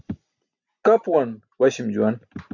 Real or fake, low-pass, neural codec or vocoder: real; 7.2 kHz; none